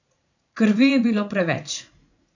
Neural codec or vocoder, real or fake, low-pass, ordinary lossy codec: vocoder, 44.1 kHz, 80 mel bands, Vocos; fake; 7.2 kHz; none